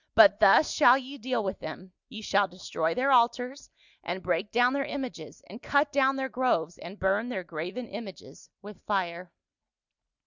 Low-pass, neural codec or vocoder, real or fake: 7.2 kHz; none; real